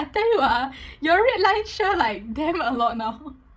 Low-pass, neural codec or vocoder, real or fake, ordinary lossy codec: none; codec, 16 kHz, 16 kbps, FreqCodec, larger model; fake; none